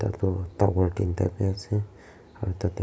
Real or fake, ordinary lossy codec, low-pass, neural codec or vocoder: real; none; none; none